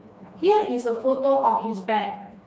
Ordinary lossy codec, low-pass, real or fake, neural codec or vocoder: none; none; fake; codec, 16 kHz, 2 kbps, FreqCodec, smaller model